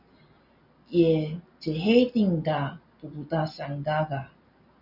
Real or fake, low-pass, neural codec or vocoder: real; 5.4 kHz; none